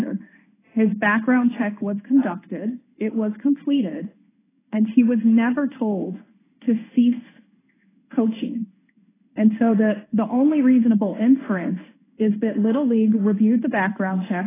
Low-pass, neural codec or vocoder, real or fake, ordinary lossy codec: 3.6 kHz; codec, 16 kHz in and 24 kHz out, 1 kbps, XY-Tokenizer; fake; AAC, 16 kbps